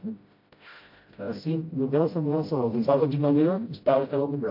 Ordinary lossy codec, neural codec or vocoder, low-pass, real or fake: MP3, 32 kbps; codec, 16 kHz, 0.5 kbps, FreqCodec, smaller model; 5.4 kHz; fake